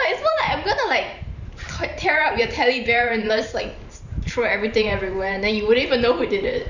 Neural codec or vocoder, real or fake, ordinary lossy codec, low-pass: vocoder, 44.1 kHz, 128 mel bands every 512 samples, BigVGAN v2; fake; none; 7.2 kHz